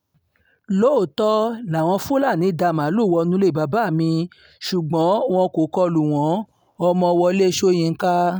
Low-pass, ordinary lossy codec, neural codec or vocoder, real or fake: none; none; none; real